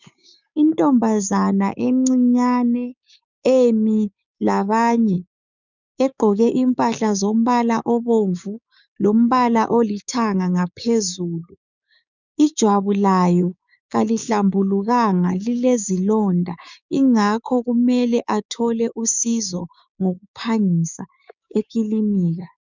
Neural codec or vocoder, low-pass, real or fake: codec, 16 kHz, 6 kbps, DAC; 7.2 kHz; fake